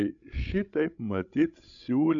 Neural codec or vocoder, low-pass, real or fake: codec, 16 kHz, 16 kbps, FreqCodec, larger model; 7.2 kHz; fake